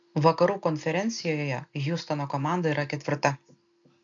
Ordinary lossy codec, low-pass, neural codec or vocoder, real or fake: AAC, 64 kbps; 7.2 kHz; none; real